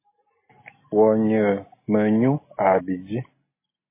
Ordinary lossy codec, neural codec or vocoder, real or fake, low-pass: MP3, 16 kbps; none; real; 3.6 kHz